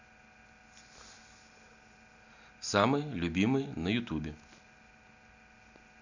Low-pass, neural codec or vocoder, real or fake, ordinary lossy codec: 7.2 kHz; none; real; none